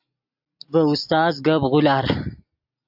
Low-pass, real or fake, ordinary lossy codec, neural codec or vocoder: 5.4 kHz; real; AAC, 48 kbps; none